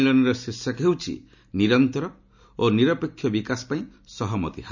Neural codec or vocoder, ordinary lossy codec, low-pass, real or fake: none; none; 7.2 kHz; real